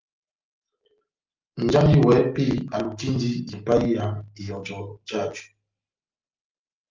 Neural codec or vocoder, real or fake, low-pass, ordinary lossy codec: none; real; 7.2 kHz; Opus, 24 kbps